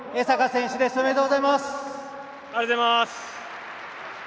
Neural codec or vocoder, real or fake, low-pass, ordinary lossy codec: none; real; none; none